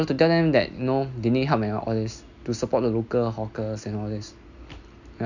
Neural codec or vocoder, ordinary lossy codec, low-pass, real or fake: none; none; 7.2 kHz; real